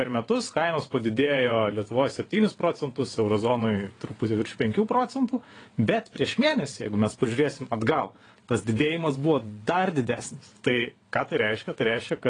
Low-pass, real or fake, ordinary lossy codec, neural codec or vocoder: 9.9 kHz; fake; AAC, 32 kbps; vocoder, 22.05 kHz, 80 mel bands, WaveNeXt